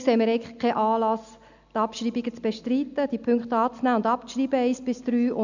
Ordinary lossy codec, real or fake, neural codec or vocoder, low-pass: none; real; none; 7.2 kHz